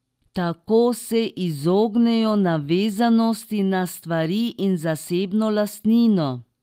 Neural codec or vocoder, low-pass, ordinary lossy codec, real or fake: none; 14.4 kHz; Opus, 24 kbps; real